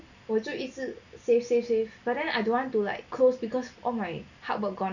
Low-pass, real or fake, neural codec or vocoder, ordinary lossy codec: 7.2 kHz; real; none; none